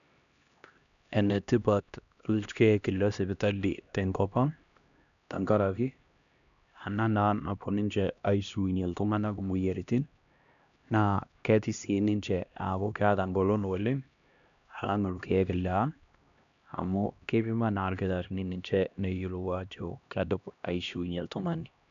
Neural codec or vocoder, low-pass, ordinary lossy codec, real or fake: codec, 16 kHz, 1 kbps, X-Codec, HuBERT features, trained on LibriSpeech; 7.2 kHz; none; fake